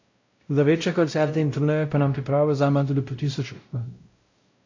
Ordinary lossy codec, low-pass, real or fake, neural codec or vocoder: AAC, 48 kbps; 7.2 kHz; fake; codec, 16 kHz, 0.5 kbps, X-Codec, WavLM features, trained on Multilingual LibriSpeech